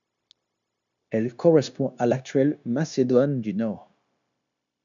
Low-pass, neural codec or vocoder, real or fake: 7.2 kHz; codec, 16 kHz, 0.9 kbps, LongCat-Audio-Codec; fake